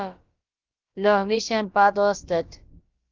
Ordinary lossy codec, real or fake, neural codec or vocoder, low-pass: Opus, 24 kbps; fake; codec, 16 kHz, about 1 kbps, DyCAST, with the encoder's durations; 7.2 kHz